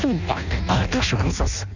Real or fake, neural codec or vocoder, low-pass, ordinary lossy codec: fake; codec, 16 kHz in and 24 kHz out, 0.6 kbps, FireRedTTS-2 codec; 7.2 kHz; none